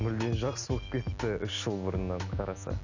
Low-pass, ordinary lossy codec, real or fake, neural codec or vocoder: 7.2 kHz; none; real; none